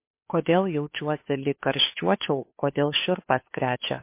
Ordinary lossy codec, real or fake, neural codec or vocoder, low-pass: MP3, 24 kbps; fake; codec, 16 kHz, 2 kbps, FunCodec, trained on Chinese and English, 25 frames a second; 3.6 kHz